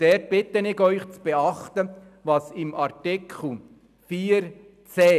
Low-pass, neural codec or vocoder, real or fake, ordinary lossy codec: 14.4 kHz; none; real; none